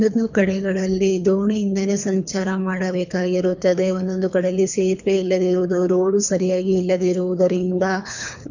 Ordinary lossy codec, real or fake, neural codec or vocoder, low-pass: none; fake; codec, 24 kHz, 3 kbps, HILCodec; 7.2 kHz